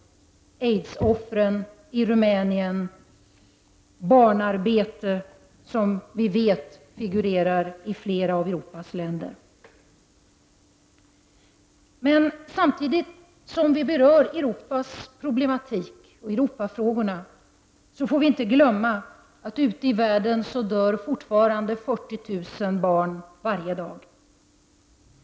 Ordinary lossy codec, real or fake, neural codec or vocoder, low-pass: none; real; none; none